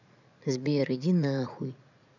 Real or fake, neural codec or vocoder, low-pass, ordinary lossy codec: fake; vocoder, 44.1 kHz, 80 mel bands, Vocos; 7.2 kHz; none